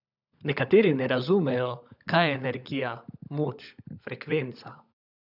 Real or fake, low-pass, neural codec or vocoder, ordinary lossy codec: fake; 5.4 kHz; codec, 16 kHz, 16 kbps, FunCodec, trained on LibriTTS, 50 frames a second; none